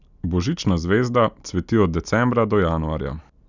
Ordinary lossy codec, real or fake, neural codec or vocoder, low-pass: none; real; none; 7.2 kHz